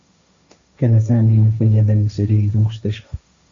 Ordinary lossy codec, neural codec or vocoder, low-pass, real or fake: Opus, 64 kbps; codec, 16 kHz, 1.1 kbps, Voila-Tokenizer; 7.2 kHz; fake